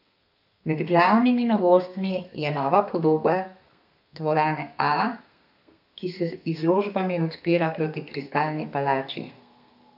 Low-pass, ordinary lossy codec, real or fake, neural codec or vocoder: 5.4 kHz; none; fake; codec, 32 kHz, 1.9 kbps, SNAC